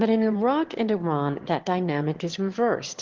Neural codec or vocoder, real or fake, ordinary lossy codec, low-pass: autoencoder, 22.05 kHz, a latent of 192 numbers a frame, VITS, trained on one speaker; fake; Opus, 16 kbps; 7.2 kHz